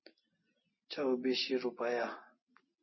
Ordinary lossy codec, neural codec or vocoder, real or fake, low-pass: MP3, 24 kbps; none; real; 7.2 kHz